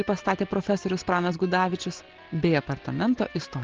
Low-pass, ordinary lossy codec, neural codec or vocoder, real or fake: 7.2 kHz; Opus, 16 kbps; none; real